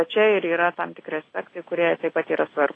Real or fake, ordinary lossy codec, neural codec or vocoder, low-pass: real; AAC, 32 kbps; none; 9.9 kHz